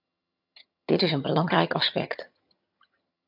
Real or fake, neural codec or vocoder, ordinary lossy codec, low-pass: fake; vocoder, 22.05 kHz, 80 mel bands, HiFi-GAN; MP3, 48 kbps; 5.4 kHz